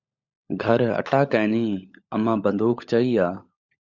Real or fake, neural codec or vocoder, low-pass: fake; codec, 16 kHz, 16 kbps, FunCodec, trained on LibriTTS, 50 frames a second; 7.2 kHz